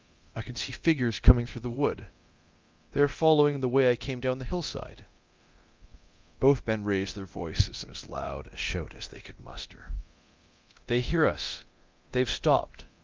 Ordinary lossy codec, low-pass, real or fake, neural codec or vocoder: Opus, 24 kbps; 7.2 kHz; fake; codec, 24 kHz, 0.9 kbps, DualCodec